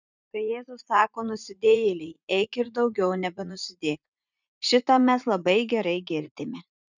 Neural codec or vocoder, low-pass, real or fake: vocoder, 44.1 kHz, 80 mel bands, Vocos; 7.2 kHz; fake